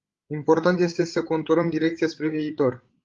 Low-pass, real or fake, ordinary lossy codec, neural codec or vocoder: 7.2 kHz; fake; Opus, 32 kbps; codec, 16 kHz, 8 kbps, FreqCodec, larger model